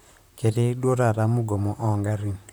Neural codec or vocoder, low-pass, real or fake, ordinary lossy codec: vocoder, 44.1 kHz, 128 mel bands, Pupu-Vocoder; none; fake; none